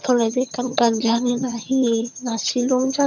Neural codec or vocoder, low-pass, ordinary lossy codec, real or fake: vocoder, 22.05 kHz, 80 mel bands, HiFi-GAN; 7.2 kHz; none; fake